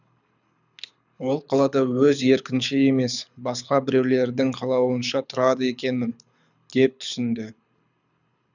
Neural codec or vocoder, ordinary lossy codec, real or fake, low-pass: codec, 24 kHz, 6 kbps, HILCodec; none; fake; 7.2 kHz